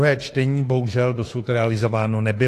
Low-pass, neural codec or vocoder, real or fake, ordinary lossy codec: 14.4 kHz; autoencoder, 48 kHz, 32 numbers a frame, DAC-VAE, trained on Japanese speech; fake; AAC, 48 kbps